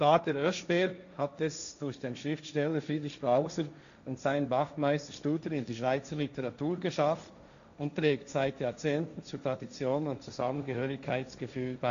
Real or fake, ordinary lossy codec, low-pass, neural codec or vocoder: fake; none; 7.2 kHz; codec, 16 kHz, 1.1 kbps, Voila-Tokenizer